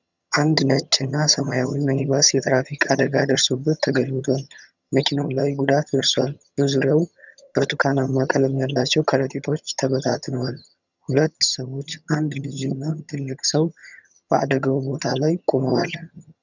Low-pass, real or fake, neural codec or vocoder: 7.2 kHz; fake; vocoder, 22.05 kHz, 80 mel bands, HiFi-GAN